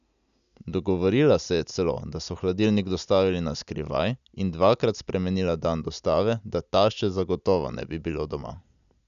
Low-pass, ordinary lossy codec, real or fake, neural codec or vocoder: 7.2 kHz; none; real; none